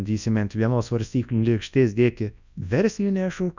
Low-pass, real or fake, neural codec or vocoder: 7.2 kHz; fake; codec, 24 kHz, 0.9 kbps, WavTokenizer, large speech release